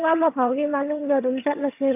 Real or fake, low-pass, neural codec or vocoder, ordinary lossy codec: fake; 3.6 kHz; vocoder, 22.05 kHz, 80 mel bands, HiFi-GAN; AAC, 24 kbps